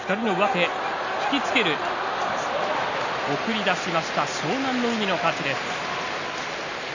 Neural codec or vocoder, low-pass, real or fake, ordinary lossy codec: none; 7.2 kHz; real; none